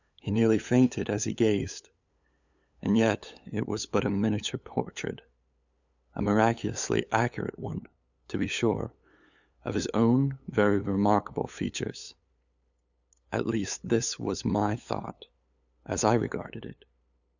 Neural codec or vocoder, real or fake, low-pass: codec, 16 kHz, 8 kbps, FunCodec, trained on LibriTTS, 25 frames a second; fake; 7.2 kHz